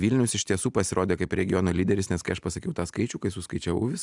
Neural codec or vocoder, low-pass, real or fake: none; 10.8 kHz; real